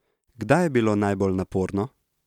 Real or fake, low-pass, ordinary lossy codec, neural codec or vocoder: real; 19.8 kHz; none; none